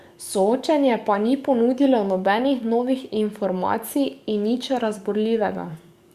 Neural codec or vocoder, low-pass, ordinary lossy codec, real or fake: codec, 44.1 kHz, 7.8 kbps, DAC; 14.4 kHz; Opus, 64 kbps; fake